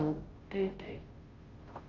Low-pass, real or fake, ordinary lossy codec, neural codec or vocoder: 7.2 kHz; fake; Opus, 32 kbps; codec, 16 kHz, 0.5 kbps, FunCodec, trained on Chinese and English, 25 frames a second